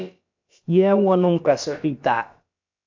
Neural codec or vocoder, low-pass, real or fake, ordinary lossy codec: codec, 16 kHz, about 1 kbps, DyCAST, with the encoder's durations; 7.2 kHz; fake; AAC, 48 kbps